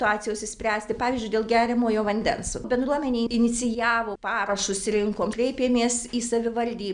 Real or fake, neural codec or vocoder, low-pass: real; none; 9.9 kHz